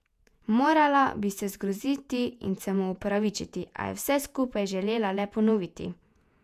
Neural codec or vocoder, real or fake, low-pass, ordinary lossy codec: vocoder, 48 kHz, 128 mel bands, Vocos; fake; 14.4 kHz; none